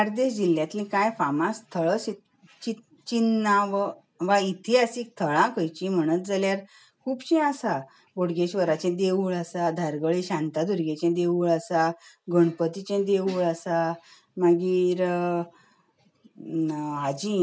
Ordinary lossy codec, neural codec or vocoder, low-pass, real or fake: none; none; none; real